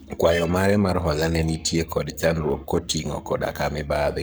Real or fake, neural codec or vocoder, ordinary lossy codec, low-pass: fake; codec, 44.1 kHz, 7.8 kbps, Pupu-Codec; none; none